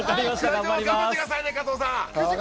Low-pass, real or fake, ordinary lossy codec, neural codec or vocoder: none; real; none; none